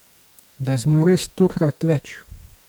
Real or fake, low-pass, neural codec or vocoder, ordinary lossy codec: fake; none; codec, 44.1 kHz, 2.6 kbps, SNAC; none